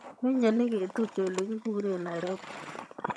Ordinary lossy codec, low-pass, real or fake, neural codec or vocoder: none; none; fake; vocoder, 22.05 kHz, 80 mel bands, HiFi-GAN